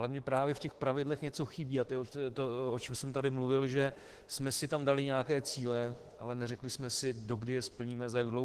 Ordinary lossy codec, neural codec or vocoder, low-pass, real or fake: Opus, 16 kbps; autoencoder, 48 kHz, 32 numbers a frame, DAC-VAE, trained on Japanese speech; 14.4 kHz; fake